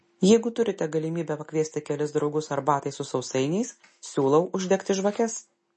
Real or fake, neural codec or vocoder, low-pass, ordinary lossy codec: real; none; 10.8 kHz; MP3, 32 kbps